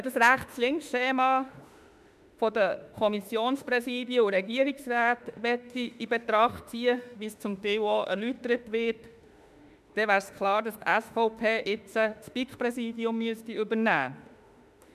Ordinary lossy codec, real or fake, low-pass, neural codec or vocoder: none; fake; 14.4 kHz; autoencoder, 48 kHz, 32 numbers a frame, DAC-VAE, trained on Japanese speech